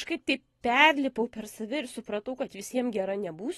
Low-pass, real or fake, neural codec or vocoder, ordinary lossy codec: 19.8 kHz; fake; vocoder, 44.1 kHz, 128 mel bands every 256 samples, BigVGAN v2; AAC, 32 kbps